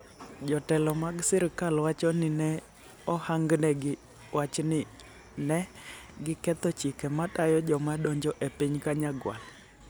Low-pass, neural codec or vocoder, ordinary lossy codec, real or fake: none; none; none; real